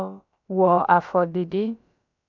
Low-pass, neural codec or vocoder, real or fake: 7.2 kHz; codec, 16 kHz, about 1 kbps, DyCAST, with the encoder's durations; fake